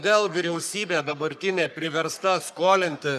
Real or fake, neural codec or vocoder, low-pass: fake; codec, 44.1 kHz, 3.4 kbps, Pupu-Codec; 14.4 kHz